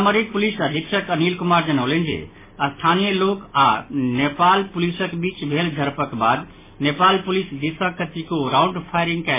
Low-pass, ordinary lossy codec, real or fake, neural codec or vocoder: 3.6 kHz; MP3, 16 kbps; real; none